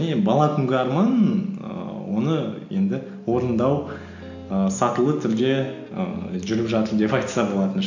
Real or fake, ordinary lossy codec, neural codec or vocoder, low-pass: real; none; none; 7.2 kHz